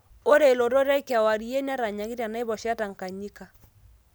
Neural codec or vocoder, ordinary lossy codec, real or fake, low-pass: none; none; real; none